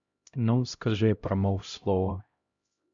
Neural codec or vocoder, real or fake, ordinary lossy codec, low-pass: codec, 16 kHz, 0.5 kbps, X-Codec, HuBERT features, trained on LibriSpeech; fake; Opus, 64 kbps; 7.2 kHz